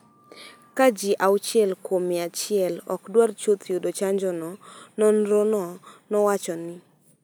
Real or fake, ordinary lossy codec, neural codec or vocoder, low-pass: real; none; none; none